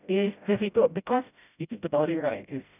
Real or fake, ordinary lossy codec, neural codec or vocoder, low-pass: fake; none; codec, 16 kHz, 0.5 kbps, FreqCodec, smaller model; 3.6 kHz